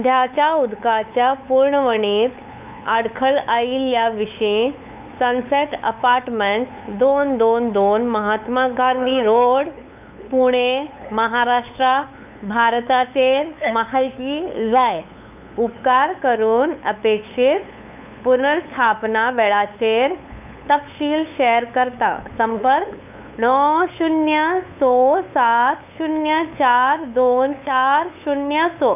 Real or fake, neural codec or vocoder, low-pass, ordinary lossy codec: fake; codec, 16 kHz, 8 kbps, FunCodec, trained on LibriTTS, 25 frames a second; 3.6 kHz; none